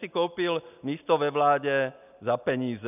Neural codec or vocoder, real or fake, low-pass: none; real; 3.6 kHz